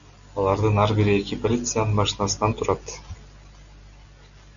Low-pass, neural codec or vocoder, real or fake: 7.2 kHz; none; real